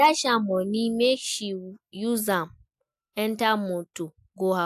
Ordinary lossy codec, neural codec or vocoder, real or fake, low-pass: AAC, 96 kbps; none; real; 14.4 kHz